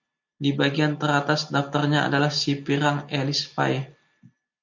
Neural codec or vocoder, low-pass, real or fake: none; 7.2 kHz; real